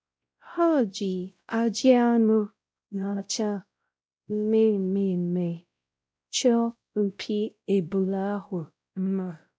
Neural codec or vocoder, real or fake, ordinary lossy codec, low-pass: codec, 16 kHz, 0.5 kbps, X-Codec, WavLM features, trained on Multilingual LibriSpeech; fake; none; none